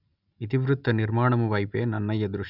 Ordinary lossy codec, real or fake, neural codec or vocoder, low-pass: none; real; none; 5.4 kHz